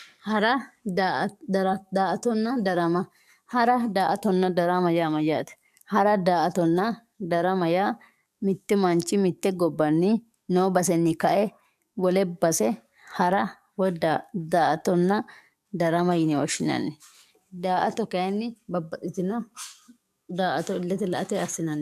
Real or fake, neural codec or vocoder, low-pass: fake; codec, 44.1 kHz, 7.8 kbps, DAC; 14.4 kHz